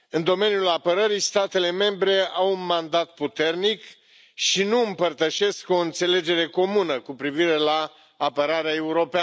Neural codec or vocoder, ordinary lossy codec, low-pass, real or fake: none; none; none; real